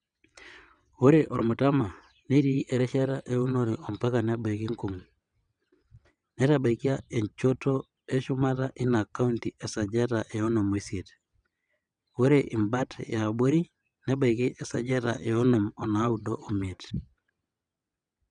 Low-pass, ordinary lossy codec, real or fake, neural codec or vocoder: 9.9 kHz; none; fake; vocoder, 22.05 kHz, 80 mel bands, WaveNeXt